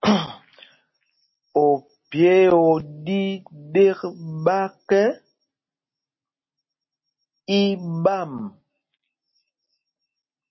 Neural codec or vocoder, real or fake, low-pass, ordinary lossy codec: none; real; 7.2 kHz; MP3, 24 kbps